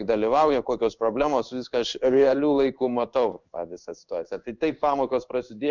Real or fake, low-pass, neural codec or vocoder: fake; 7.2 kHz; codec, 16 kHz in and 24 kHz out, 1 kbps, XY-Tokenizer